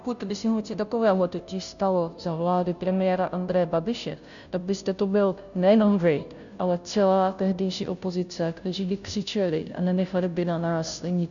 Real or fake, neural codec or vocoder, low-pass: fake; codec, 16 kHz, 0.5 kbps, FunCodec, trained on Chinese and English, 25 frames a second; 7.2 kHz